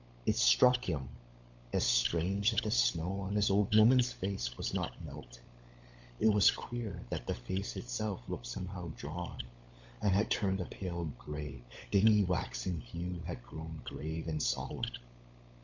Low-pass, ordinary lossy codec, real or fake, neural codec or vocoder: 7.2 kHz; MP3, 64 kbps; fake; codec, 16 kHz, 16 kbps, FunCodec, trained on LibriTTS, 50 frames a second